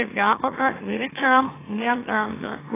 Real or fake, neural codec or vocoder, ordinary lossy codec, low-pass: fake; autoencoder, 44.1 kHz, a latent of 192 numbers a frame, MeloTTS; AAC, 16 kbps; 3.6 kHz